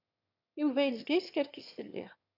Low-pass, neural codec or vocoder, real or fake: 5.4 kHz; autoencoder, 22.05 kHz, a latent of 192 numbers a frame, VITS, trained on one speaker; fake